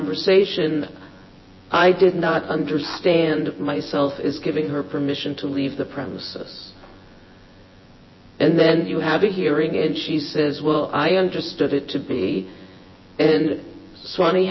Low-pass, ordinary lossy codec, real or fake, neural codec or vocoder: 7.2 kHz; MP3, 24 kbps; fake; vocoder, 24 kHz, 100 mel bands, Vocos